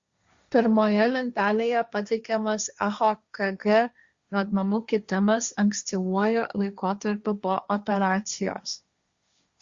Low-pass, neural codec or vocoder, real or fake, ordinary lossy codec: 7.2 kHz; codec, 16 kHz, 1.1 kbps, Voila-Tokenizer; fake; Opus, 64 kbps